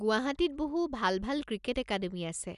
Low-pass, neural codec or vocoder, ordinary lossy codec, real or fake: 10.8 kHz; none; none; real